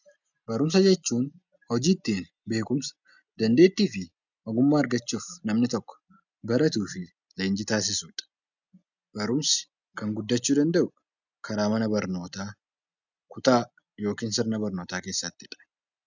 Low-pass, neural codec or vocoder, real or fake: 7.2 kHz; none; real